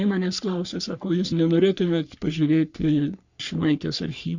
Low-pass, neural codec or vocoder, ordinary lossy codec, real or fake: 7.2 kHz; codec, 44.1 kHz, 3.4 kbps, Pupu-Codec; Opus, 64 kbps; fake